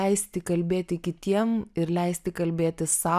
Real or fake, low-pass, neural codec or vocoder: real; 14.4 kHz; none